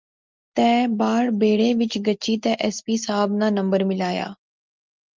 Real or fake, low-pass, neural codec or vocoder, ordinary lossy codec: real; 7.2 kHz; none; Opus, 24 kbps